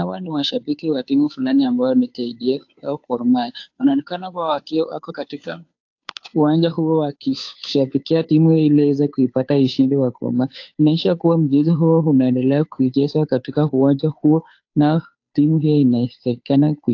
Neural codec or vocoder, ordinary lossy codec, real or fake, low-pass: codec, 16 kHz, 2 kbps, FunCodec, trained on Chinese and English, 25 frames a second; AAC, 48 kbps; fake; 7.2 kHz